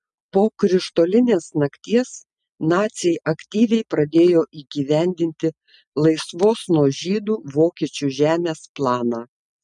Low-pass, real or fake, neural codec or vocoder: 10.8 kHz; real; none